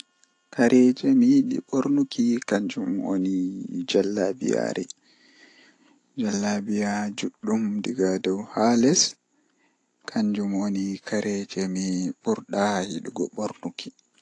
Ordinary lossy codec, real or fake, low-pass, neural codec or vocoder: AAC, 48 kbps; real; 10.8 kHz; none